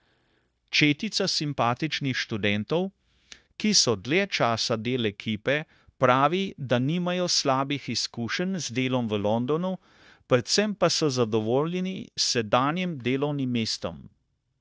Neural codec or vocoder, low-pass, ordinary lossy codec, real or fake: codec, 16 kHz, 0.9 kbps, LongCat-Audio-Codec; none; none; fake